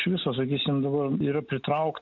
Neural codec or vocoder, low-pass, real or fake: none; 7.2 kHz; real